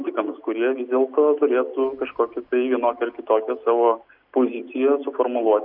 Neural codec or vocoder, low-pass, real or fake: none; 5.4 kHz; real